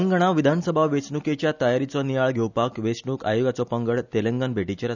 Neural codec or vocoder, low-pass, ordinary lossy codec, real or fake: none; 7.2 kHz; none; real